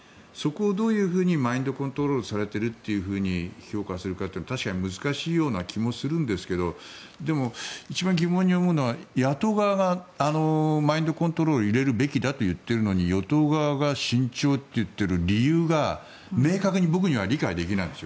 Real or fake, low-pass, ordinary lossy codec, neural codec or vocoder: real; none; none; none